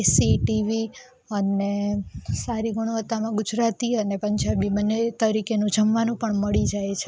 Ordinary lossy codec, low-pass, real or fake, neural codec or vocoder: none; none; real; none